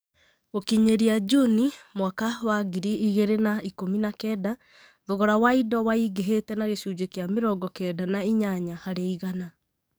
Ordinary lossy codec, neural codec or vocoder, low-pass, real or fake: none; codec, 44.1 kHz, 7.8 kbps, DAC; none; fake